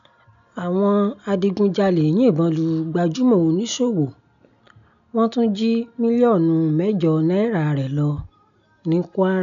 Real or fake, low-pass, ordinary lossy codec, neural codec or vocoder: real; 7.2 kHz; none; none